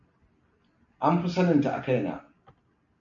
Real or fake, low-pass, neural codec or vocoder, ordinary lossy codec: real; 7.2 kHz; none; MP3, 48 kbps